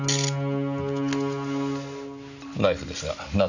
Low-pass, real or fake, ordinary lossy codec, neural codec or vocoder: 7.2 kHz; real; none; none